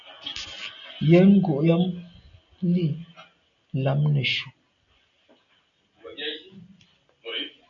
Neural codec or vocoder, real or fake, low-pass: none; real; 7.2 kHz